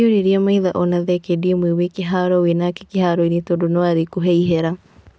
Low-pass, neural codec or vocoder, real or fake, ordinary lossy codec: none; none; real; none